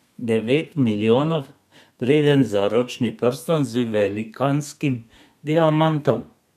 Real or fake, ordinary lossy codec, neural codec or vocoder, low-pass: fake; none; codec, 32 kHz, 1.9 kbps, SNAC; 14.4 kHz